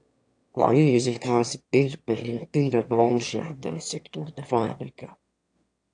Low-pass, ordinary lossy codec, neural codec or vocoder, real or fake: 9.9 kHz; AAC, 64 kbps; autoencoder, 22.05 kHz, a latent of 192 numbers a frame, VITS, trained on one speaker; fake